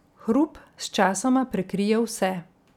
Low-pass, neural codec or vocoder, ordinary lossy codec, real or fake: 19.8 kHz; none; none; real